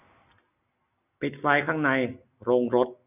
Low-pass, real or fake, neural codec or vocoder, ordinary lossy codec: 3.6 kHz; real; none; none